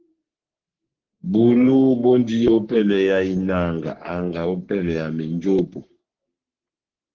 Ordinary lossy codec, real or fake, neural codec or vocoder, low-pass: Opus, 16 kbps; fake; codec, 44.1 kHz, 3.4 kbps, Pupu-Codec; 7.2 kHz